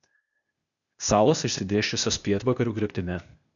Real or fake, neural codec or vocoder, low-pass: fake; codec, 16 kHz, 0.8 kbps, ZipCodec; 7.2 kHz